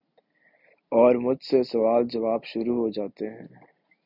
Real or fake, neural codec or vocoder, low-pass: real; none; 5.4 kHz